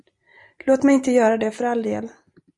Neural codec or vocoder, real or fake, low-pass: none; real; 10.8 kHz